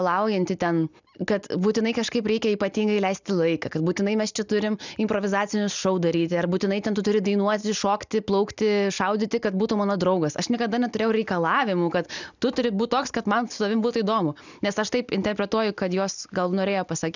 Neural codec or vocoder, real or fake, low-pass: none; real; 7.2 kHz